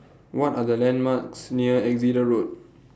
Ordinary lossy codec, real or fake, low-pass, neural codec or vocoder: none; real; none; none